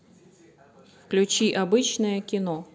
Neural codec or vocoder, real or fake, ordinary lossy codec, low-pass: none; real; none; none